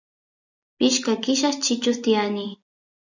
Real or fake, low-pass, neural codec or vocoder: real; 7.2 kHz; none